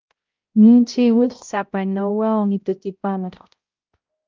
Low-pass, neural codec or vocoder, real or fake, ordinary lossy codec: 7.2 kHz; codec, 16 kHz, 0.5 kbps, X-Codec, HuBERT features, trained on balanced general audio; fake; Opus, 24 kbps